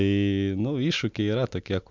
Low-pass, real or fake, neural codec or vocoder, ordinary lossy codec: 7.2 kHz; real; none; MP3, 64 kbps